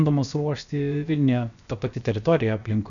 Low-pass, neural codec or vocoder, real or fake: 7.2 kHz; codec, 16 kHz, about 1 kbps, DyCAST, with the encoder's durations; fake